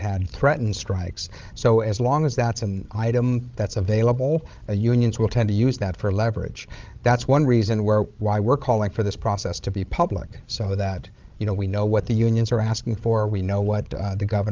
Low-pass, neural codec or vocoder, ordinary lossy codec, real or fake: 7.2 kHz; codec, 16 kHz, 16 kbps, FreqCodec, larger model; Opus, 32 kbps; fake